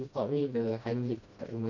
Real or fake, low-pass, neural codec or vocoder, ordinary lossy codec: fake; 7.2 kHz; codec, 16 kHz, 1 kbps, FreqCodec, smaller model; none